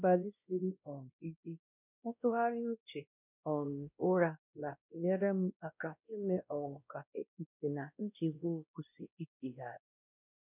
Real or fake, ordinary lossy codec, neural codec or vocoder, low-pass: fake; none; codec, 16 kHz, 1 kbps, X-Codec, WavLM features, trained on Multilingual LibriSpeech; 3.6 kHz